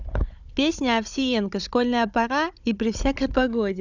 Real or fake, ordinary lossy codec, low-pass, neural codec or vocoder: fake; none; 7.2 kHz; codec, 16 kHz, 16 kbps, FunCodec, trained on Chinese and English, 50 frames a second